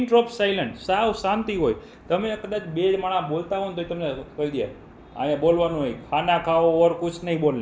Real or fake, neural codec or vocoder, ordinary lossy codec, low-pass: real; none; none; none